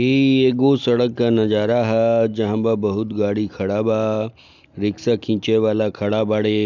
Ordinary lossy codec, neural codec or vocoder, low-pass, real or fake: none; none; 7.2 kHz; real